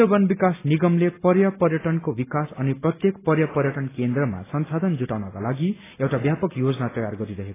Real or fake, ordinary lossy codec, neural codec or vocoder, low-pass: real; AAC, 16 kbps; none; 3.6 kHz